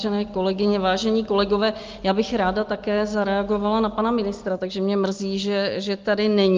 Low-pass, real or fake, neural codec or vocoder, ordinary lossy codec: 7.2 kHz; real; none; Opus, 32 kbps